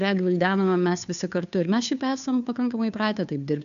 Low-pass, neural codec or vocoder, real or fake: 7.2 kHz; codec, 16 kHz, 2 kbps, FunCodec, trained on Chinese and English, 25 frames a second; fake